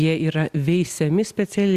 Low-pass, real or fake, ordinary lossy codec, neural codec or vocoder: 14.4 kHz; real; Opus, 64 kbps; none